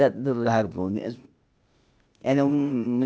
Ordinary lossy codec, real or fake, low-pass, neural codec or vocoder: none; fake; none; codec, 16 kHz, 0.8 kbps, ZipCodec